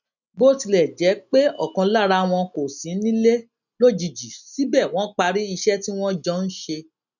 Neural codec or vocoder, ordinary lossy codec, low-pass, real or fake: none; none; 7.2 kHz; real